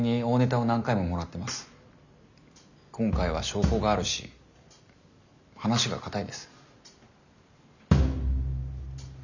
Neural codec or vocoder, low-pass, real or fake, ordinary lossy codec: none; 7.2 kHz; real; none